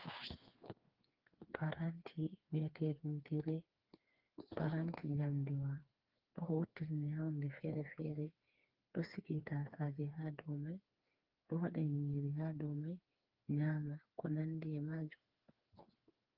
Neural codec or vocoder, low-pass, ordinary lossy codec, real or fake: codec, 16 kHz, 4 kbps, FreqCodec, smaller model; 5.4 kHz; Opus, 32 kbps; fake